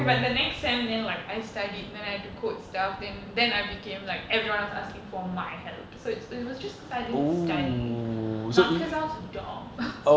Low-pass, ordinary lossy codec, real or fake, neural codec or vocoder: none; none; real; none